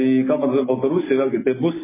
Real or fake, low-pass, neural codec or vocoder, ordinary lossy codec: real; 3.6 kHz; none; MP3, 16 kbps